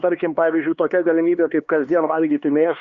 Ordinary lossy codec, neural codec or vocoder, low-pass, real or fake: Opus, 64 kbps; codec, 16 kHz, 2 kbps, X-Codec, HuBERT features, trained on LibriSpeech; 7.2 kHz; fake